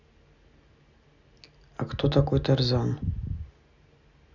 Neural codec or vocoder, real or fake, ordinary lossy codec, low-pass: none; real; none; 7.2 kHz